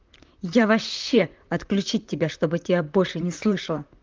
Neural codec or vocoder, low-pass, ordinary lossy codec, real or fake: vocoder, 44.1 kHz, 128 mel bands, Pupu-Vocoder; 7.2 kHz; Opus, 32 kbps; fake